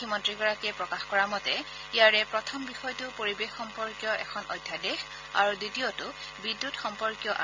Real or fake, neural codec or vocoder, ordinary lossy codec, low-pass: real; none; MP3, 64 kbps; 7.2 kHz